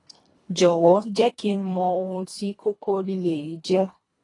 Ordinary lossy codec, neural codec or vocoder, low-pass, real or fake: AAC, 32 kbps; codec, 24 kHz, 1.5 kbps, HILCodec; 10.8 kHz; fake